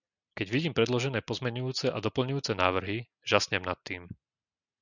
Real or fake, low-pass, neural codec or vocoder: real; 7.2 kHz; none